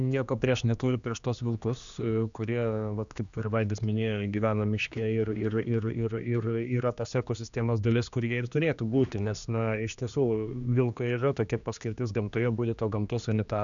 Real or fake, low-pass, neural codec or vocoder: fake; 7.2 kHz; codec, 16 kHz, 2 kbps, X-Codec, HuBERT features, trained on general audio